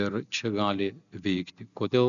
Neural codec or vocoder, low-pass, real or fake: none; 7.2 kHz; real